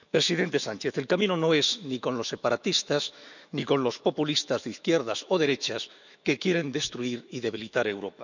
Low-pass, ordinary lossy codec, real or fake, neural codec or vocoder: 7.2 kHz; none; fake; autoencoder, 48 kHz, 128 numbers a frame, DAC-VAE, trained on Japanese speech